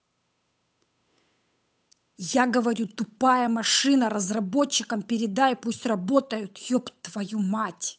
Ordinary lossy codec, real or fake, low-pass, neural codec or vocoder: none; fake; none; codec, 16 kHz, 8 kbps, FunCodec, trained on Chinese and English, 25 frames a second